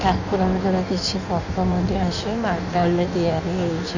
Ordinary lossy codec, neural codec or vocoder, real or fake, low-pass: none; codec, 16 kHz in and 24 kHz out, 1.1 kbps, FireRedTTS-2 codec; fake; 7.2 kHz